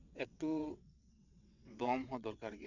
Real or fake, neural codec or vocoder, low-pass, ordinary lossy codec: fake; vocoder, 22.05 kHz, 80 mel bands, WaveNeXt; 7.2 kHz; none